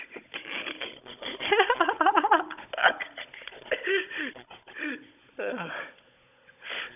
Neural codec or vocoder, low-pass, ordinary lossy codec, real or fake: codec, 16 kHz, 16 kbps, FunCodec, trained on Chinese and English, 50 frames a second; 3.6 kHz; none; fake